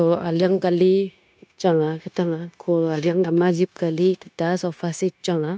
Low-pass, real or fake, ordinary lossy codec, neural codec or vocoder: none; fake; none; codec, 16 kHz, 0.9 kbps, LongCat-Audio-Codec